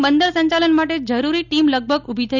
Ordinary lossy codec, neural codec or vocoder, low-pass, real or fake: none; none; 7.2 kHz; real